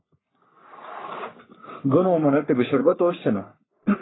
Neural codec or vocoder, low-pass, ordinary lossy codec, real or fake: codec, 44.1 kHz, 3.4 kbps, Pupu-Codec; 7.2 kHz; AAC, 16 kbps; fake